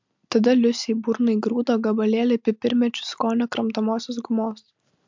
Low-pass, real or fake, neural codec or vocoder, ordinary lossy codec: 7.2 kHz; real; none; MP3, 64 kbps